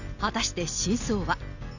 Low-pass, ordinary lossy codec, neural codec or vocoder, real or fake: 7.2 kHz; none; none; real